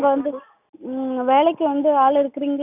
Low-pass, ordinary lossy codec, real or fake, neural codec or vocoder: 3.6 kHz; none; real; none